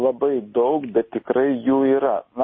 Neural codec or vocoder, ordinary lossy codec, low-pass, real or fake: none; MP3, 24 kbps; 7.2 kHz; real